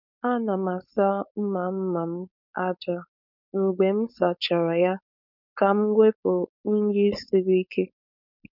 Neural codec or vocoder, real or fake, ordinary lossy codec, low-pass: codec, 16 kHz, 4.8 kbps, FACodec; fake; none; 5.4 kHz